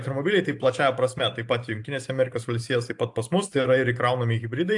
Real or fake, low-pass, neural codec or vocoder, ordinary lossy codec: fake; 10.8 kHz; vocoder, 44.1 kHz, 128 mel bands every 512 samples, BigVGAN v2; AAC, 64 kbps